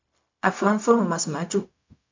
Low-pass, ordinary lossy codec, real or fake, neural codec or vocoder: 7.2 kHz; MP3, 48 kbps; fake; codec, 16 kHz, 0.4 kbps, LongCat-Audio-Codec